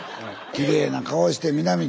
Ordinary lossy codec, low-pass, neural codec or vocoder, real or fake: none; none; none; real